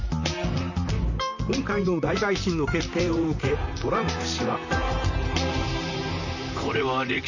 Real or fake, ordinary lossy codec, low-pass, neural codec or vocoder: fake; none; 7.2 kHz; vocoder, 44.1 kHz, 128 mel bands, Pupu-Vocoder